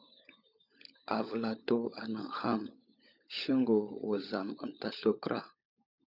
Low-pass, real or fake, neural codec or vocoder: 5.4 kHz; fake; codec, 16 kHz, 16 kbps, FunCodec, trained on LibriTTS, 50 frames a second